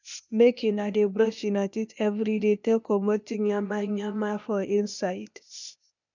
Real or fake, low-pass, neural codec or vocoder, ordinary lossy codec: fake; 7.2 kHz; codec, 16 kHz, 0.8 kbps, ZipCodec; none